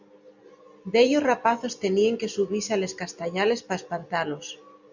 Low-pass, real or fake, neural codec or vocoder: 7.2 kHz; real; none